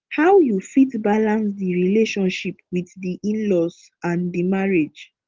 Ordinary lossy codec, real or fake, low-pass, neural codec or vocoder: Opus, 16 kbps; real; 7.2 kHz; none